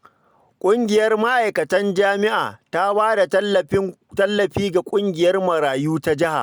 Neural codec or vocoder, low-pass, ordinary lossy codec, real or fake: none; none; none; real